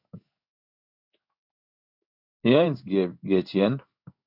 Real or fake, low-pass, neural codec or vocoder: fake; 5.4 kHz; codec, 16 kHz in and 24 kHz out, 1 kbps, XY-Tokenizer